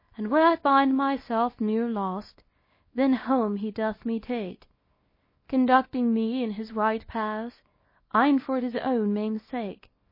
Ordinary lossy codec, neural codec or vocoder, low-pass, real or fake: MP3, 24 kbps; codec, 24 kHz, 0.9 kbps, WavTokenizer, small release; 5.4 kHz; fake